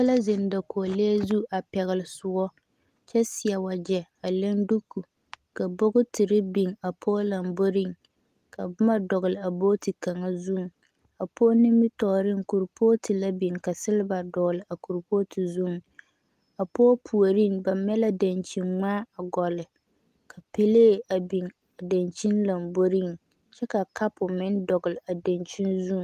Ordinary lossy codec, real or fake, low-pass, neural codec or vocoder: Opus, 24 kbps; real; 14.4 kHz; none